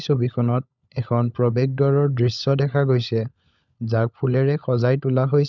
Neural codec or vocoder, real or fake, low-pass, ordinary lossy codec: codec, 16 kHz, 16 kbps, FunCodec, trained on LibriTTS, 50 frames a second; fake; 7.2 kHz; none